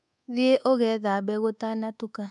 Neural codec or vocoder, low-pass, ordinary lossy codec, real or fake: codec, 24 kHz, 1.2 kbps, DualCodec; 10.8 kHz; none; fake